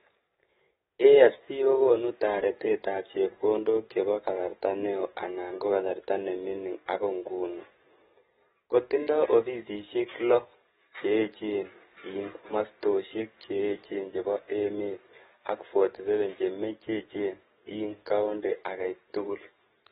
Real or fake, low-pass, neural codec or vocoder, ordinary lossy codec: fake; 19.8 kHz; codec, 44.1 kHz, 7.8 kbps, DAC; AAC, 16 kbps